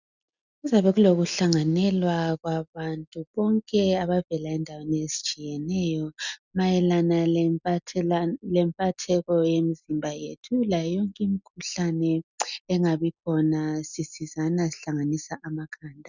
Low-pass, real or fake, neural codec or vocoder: 7.2 kHz; real; none